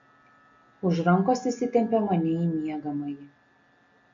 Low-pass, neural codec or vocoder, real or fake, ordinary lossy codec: 7.2 kHz; none; real; MP3, 64 kbps